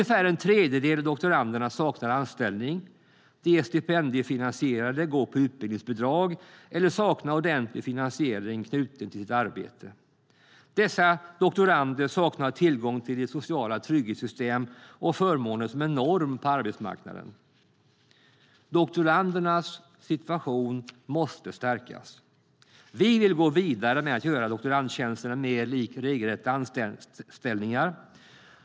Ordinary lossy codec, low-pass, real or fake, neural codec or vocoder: none; none; real; none